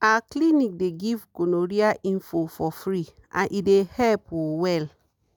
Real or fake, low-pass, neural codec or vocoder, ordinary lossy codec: real; none; none; none